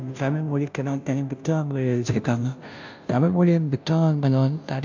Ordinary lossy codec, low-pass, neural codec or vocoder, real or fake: MP3, 64 kbps; 7.2 kHz; codec, 16 kHz, 0.5 kbps, FunCodec, trained on Chinese and English, 25 frames a second; fake